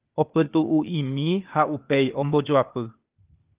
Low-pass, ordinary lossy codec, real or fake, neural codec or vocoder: 3.6 kHz; Opus, 24 kbps; fake; codec, 16 kHz, 0.8 kbps, ZipCodec